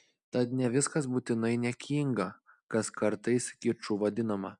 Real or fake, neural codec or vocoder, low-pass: real; none; 10.8 kHz